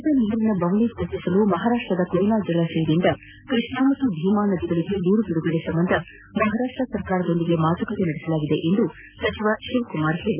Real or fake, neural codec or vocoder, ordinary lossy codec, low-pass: real; none; none; 3.6 kHz